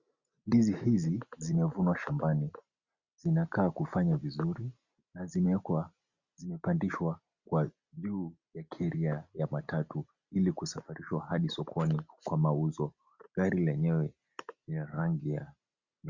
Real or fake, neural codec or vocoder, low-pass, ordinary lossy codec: real; none; 7.2 kHz; Opus, 64 kbps